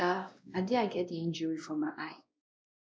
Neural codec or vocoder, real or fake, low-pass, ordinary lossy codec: codec, 16 kHz, 1 kbps, X-Codec, WavLM features, trained on Multilingual LibriSpeech; fake; none; none